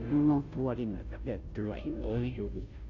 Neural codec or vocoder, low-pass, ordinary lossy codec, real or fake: codec, 16 kHz, 0.5 kbps, FunCodec, trained on Chinese and English, 25 frames a second; 7.2 kHz; Opus, 64 kbps; fake